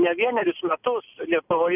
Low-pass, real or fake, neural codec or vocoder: 3.6 kHz; real; none